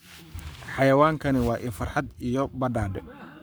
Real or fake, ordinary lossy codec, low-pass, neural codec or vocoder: fake; none; none; codec, 44.1 kHz, 7.8 kbps, Pupu-Codec